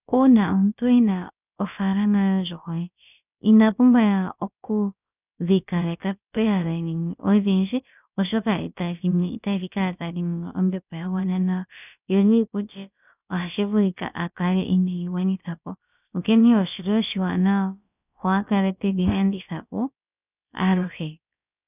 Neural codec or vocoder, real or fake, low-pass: codec, 16 kHz, about 1 kbps, DyCAST, with the encoder's durations; fake; 3.6 kHz